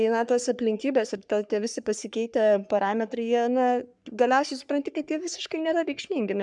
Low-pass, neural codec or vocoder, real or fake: 10.8 kHz; codec, 44.1 kHz, 3.4 kbps, Pupu-Codec; fake